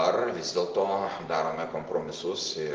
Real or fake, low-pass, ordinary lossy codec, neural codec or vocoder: real; 7.2 kHz; Opus, 16 kbps; none